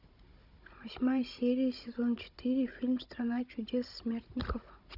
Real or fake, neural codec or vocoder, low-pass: fake; codec, 16 kHz, 16 kbps, FunCodec, trained on Chinese and English, 50 frames a second; 5.4 kHz